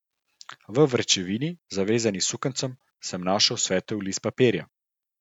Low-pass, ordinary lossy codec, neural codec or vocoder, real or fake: 19.8 kHz; none; none; real